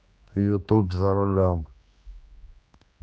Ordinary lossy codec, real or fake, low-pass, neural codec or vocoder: none; fake; none; codec, 16 kHz, 2 kbps, X-Codec, HuBERT features, trained on general audio